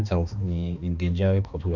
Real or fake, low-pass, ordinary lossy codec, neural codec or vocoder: fake; 7.2 kHz; none; codec, 16 kHz, 2 kbps, X-Codec, HuBERT features, trained on balanced general audio